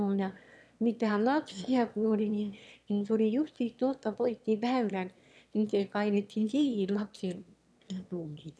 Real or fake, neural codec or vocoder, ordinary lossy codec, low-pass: fake; autoencoder, 22.05 kHz, a latent of 192 numbers a frame, VITS, trained on one speaker; none; none